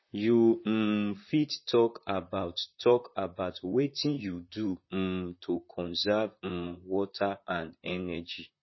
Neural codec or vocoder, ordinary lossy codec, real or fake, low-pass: vocoder, 44.1 kHz, 128 mel bands, Pupu-Vocoder; MP3, 24 kbps; fake; 7.2 kHz